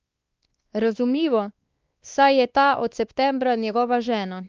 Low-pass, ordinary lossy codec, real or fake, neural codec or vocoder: 7.2 kHz; Opus, 24 kbps; fake; codec, 16 kHz, 2 kbps, X-Codec, WavLM features, trained on Multilingual LibriSpeech